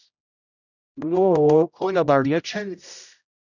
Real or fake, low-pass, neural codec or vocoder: fake; 7.2 kHz; codec, 16 kHz, 0.5 kbps, X-Codec, HuBERT features, trained on general audio